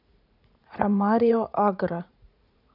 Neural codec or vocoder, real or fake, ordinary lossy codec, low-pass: vocoder, 44.1 kHz, 80 mel bands, Vocos; fake; none; 5.4 kHz